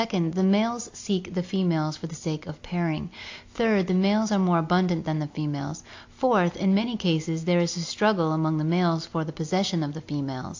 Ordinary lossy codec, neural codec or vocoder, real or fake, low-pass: AAC, 48 kbps; none; real; 7.2 kHz